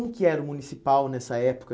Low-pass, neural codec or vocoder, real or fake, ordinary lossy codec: none; none; real; none